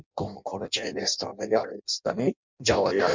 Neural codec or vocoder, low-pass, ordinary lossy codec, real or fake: codec, 16 kHz in and 24 kHz out, 0.6 kbps, FireRedTTS-2 codec; 7.2 kHz; MP3, 64 kbps; fake